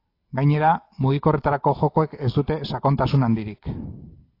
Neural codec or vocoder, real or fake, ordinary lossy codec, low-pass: none; real; AAC, 32 kbps; 5.4 kHz